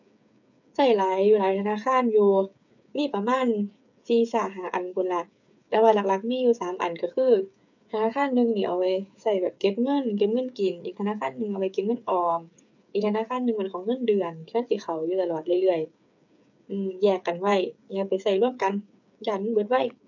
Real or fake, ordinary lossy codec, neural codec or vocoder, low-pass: fake; none; codec, 16 kHz, 8 kbps, FreqCodec, smaller model; 7.2 kHz